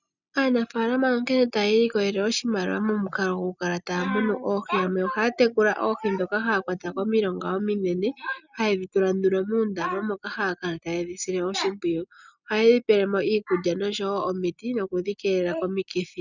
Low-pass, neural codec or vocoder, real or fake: 7.2 kHz; none; real